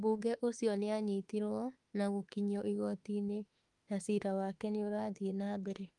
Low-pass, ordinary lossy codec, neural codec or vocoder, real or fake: 10.8 kHz; Opus, 32 kbps; autoencoder, 48 kHz, 32 numbers a frame, DAC-VAE, trained on Japanese speech; fake